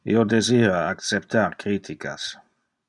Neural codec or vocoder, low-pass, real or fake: none; 10.8 kHz; real